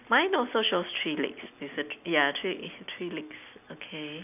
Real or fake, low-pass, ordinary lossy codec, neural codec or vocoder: real; 3.6 kHz; none; none